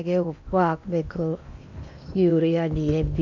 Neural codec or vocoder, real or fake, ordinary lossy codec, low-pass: codec, 16 kHz in and 24 kHz out, 0.8 kbps, FocalCodec, streaming, 65536 codes; fake; none; 7.2 kHz